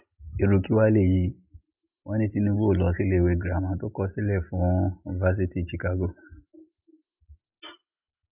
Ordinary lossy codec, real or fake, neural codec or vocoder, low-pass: none; real; none; 3.6 kHz